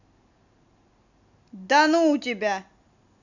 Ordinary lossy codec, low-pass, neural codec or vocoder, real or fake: none; 7.2 kHz; none; real